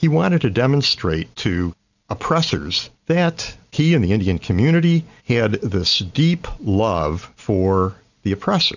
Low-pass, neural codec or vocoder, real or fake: 7.2 kHz; none; real